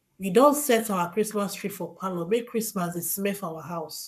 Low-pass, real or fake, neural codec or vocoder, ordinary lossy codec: 14.4 kHz; fake; codec, 44.1 kHz, 7.8 kbps, Pupu-Codec; none